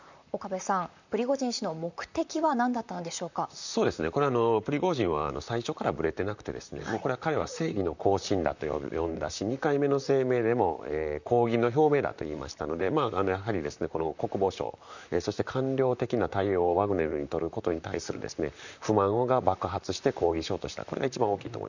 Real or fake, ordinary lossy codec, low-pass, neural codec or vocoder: fake; none; 7.2 kHz; vocoder, 44.1 kHz, 128 mel bands, Pupu-Vocoder